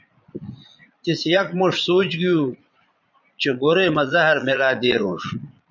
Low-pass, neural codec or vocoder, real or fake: 7.2 kHz; vocoder, 22.05 kHz, 80 mel bands, Vocos; fake